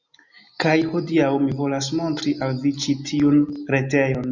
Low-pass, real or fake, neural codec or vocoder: 7.2 kHz; real; none